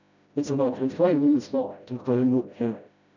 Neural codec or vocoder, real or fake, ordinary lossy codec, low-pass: codec, 16 kHz, 0.5 kbps, FreqCodec, smaller model; fake; none; 7.2 kHz